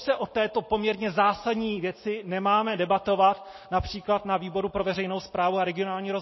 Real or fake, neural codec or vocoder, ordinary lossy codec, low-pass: real; none; MP3, 24 kbps; 7.2 kHz